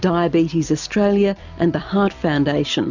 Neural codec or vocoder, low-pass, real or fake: none; 7.2 kHz; real